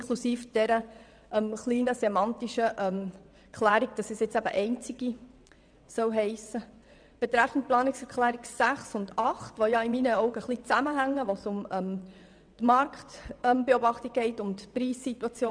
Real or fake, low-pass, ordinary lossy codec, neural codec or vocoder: fake; 9.9 kHz; none; vocoder, 22.05 kHz, 80 mel bands, WaveNeXt